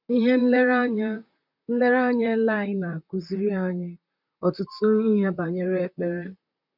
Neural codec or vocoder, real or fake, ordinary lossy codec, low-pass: vocoder, 44.1 kHz, 128 mel bands, Pupu-Vocoder; fake; none; 5.4 kHz